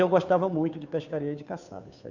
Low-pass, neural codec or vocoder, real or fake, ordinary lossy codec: 7.2 kHz; codec, 16 kHz, 2 kbps, FunCodec, trained on Chinese and English, 25 frames a second; fake; none